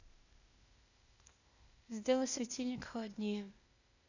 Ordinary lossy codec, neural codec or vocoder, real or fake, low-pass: none; codec, 16 kHz, 0.8 kbps, ZipCodec; fake; 7.2 kHz